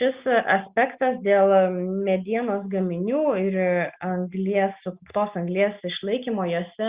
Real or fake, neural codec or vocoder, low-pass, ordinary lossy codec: real; none; 3.6 kHz; Opus, 64 kbps